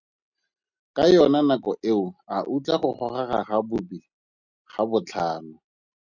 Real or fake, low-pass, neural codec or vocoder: real; 7.2 kHz; none